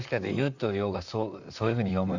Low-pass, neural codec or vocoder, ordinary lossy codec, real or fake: 7.2 kHz; vocoder, 44.1 kHz, 128 mel bands, Pupu-Vocoder; none; fake